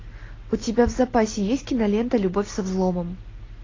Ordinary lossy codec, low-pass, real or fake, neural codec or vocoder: AAC, 32 kbps; 7.2 kHz; real; none